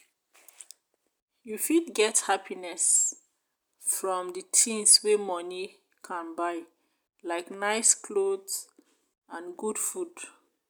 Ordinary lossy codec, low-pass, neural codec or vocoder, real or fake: none; none; none; real